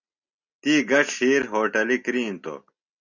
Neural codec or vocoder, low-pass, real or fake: none; 7.2 kHz; real